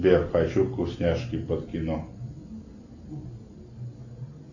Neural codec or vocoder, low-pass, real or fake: none; 7.2 kHz; real